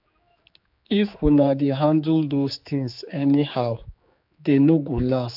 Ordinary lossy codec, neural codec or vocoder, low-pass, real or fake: AAC, 48 kbps; codec, 16 kHz, 4 kbps, X-Codec, HuBERT features, trained on general audio; 5.4 kHz; fake